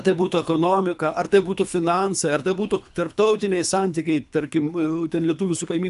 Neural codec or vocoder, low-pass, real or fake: codec, 24 kHz, 3 kbps, HILCodec; 10.8 kHz; fake